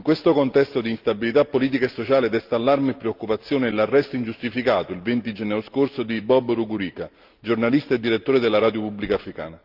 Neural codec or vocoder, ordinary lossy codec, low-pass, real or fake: none; Opus, 32 kbps; 5.4 kHz; real